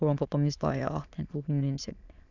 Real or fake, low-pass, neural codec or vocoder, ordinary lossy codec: fake; 7.2 kHz; autoencoder, 22.05 kHz, a latent of 192 numbers a frame, VITS, trained on many speakers; none